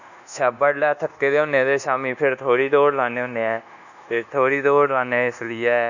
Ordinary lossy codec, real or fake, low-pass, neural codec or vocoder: none; fake; 7.2 kHz; codec, 24 kHz, 1.2 kbps, DualCodec